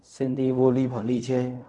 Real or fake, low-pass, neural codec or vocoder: fake; 10.8 kHz; codec, 16 kHz in and 24 kHz out, 0.4 kbps, LongCat-Audio-Codec, fine tuned four codebook decoder